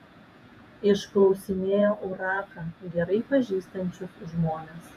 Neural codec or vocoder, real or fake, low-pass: vocoder, 48 kHz, 128 mel bands, Vocos; fake; 14.4 kHz